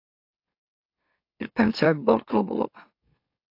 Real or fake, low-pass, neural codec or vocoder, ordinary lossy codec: fake; 5.4 kHz; autoencoder, 44.1 kHz, a latent of 192 numbers a frame, MeloTTS; AAC, 32 kbps